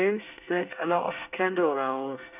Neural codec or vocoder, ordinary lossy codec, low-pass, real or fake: codec, 24 kHz, 1 kbps, SNAC; none; 3.6 kHz; fake